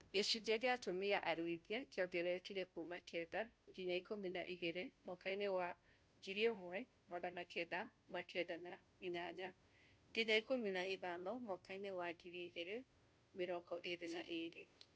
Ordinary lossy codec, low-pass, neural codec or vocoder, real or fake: none; none; codec, 16 kHz, 0.5 kbps, FunCodec, trained on Chinese and English, 25 frames a second; fake